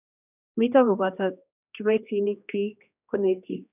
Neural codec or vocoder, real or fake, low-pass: codec, 16 kHz, 2 kbps, X-Codec, HuBERT features, trained on general audio; fake; 3.6 kHz